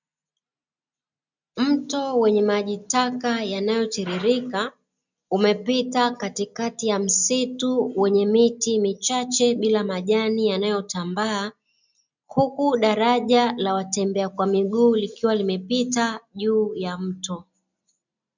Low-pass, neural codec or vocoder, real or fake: 7.2 kHz; none; real